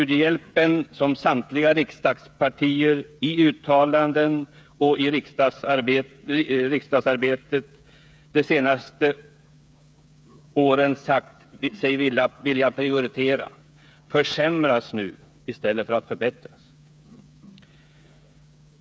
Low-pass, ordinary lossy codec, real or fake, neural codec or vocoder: none; none; fake; codec, 16 kHz, 8 kbps, FreqCodec, smaller model